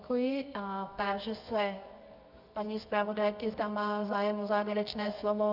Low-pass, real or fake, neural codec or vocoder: 5.4 kHz; fake; codec, 24 kHz, 0.9 kbps, WavTokenizer, medium music audio release